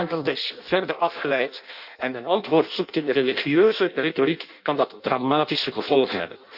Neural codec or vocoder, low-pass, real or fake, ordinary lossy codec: codec, 16 kHz in and 24 kHz out, 0.6 kbps, FireRedTTS-2 codec; 5.4 kHz; fake; Opus, 64 kbps